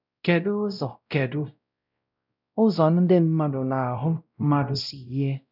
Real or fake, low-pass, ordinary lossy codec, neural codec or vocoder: fake; 5.4 kHz; none; codec, 16 kHz, 0.5 kbps, X-Codec, WavLM features, trained on Multilingual LibriSpeech